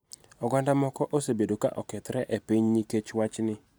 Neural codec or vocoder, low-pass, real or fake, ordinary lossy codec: none; none; real; none